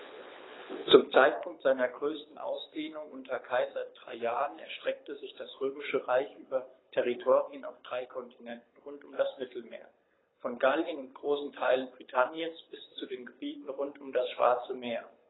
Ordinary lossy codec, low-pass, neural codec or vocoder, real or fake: AAC, 16 kbps; 7.2 kHz; codec, 24 kHz, 6 kbps, HILCodec; fake